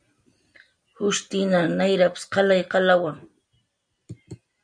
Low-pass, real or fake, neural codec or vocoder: 9.9 kHz; real; none